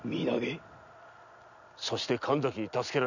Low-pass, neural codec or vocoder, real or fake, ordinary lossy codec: 7.2 kHz; none; real; none